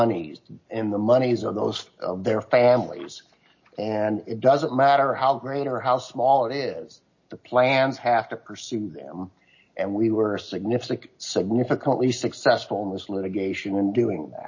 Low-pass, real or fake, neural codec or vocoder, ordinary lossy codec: 7.2 kHz; real; none; MP3, 32 kbps